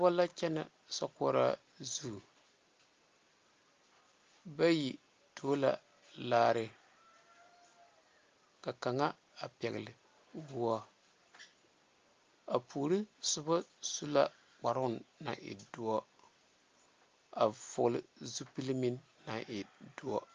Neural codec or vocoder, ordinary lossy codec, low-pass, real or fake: none; Opus, 32 kbps; 7.2 kHz; real